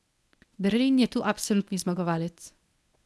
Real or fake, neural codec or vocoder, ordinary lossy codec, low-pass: fake; codec, 24 kHz, 0.9 kbps, WavTokenizer, medium speech release version 1; none; none